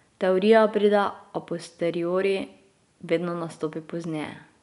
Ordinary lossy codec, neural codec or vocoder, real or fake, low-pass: none; none; real; 10.8 kHz